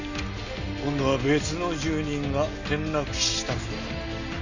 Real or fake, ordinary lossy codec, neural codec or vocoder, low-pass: real; none; none; 7.2 kHz